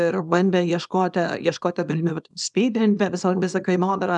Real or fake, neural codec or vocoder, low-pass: fake; codec, 24 kHz, 0.9 kbps, WavTokenizer, small release; 10.8 kHz